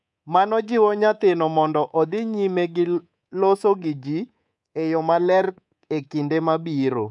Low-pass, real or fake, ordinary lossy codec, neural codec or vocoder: 10.8 kHz; fake; none; codec, 24 kHz, 3.1 kbps, DualCodec